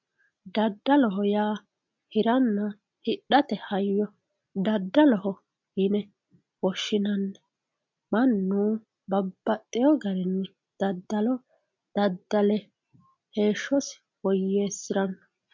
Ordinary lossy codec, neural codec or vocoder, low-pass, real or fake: MP3, 64 kbps; none; 7.2 kHz; real